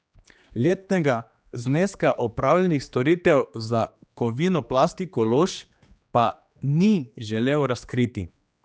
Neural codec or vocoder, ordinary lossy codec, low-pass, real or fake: codec, 16 kHz, 2 kbps, X-Codec, HuBERT features, trained on general audio; none; none; fake